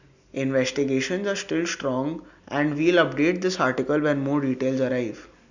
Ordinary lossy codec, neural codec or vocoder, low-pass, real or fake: none; none; 7.2 kHz; real